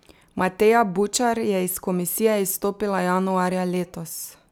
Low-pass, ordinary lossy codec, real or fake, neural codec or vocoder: none; none; real; none